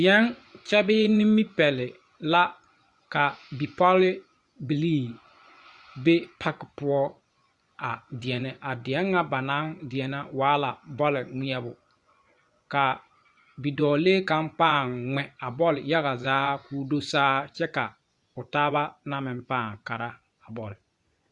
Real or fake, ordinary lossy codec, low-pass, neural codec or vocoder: fake; Opus, 64 kbps; 10.8 kHz; vocoder, 24 kHz, 100 mel bands, Vocos